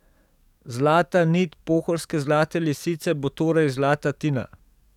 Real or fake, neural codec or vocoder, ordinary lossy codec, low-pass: fake; codec, 44.1 kHz, 7.8 kbps, DAC; none; 19.8 kHz